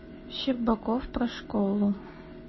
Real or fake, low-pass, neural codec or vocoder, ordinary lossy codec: real; 7.2 kHz; none; MP3, 24 kbps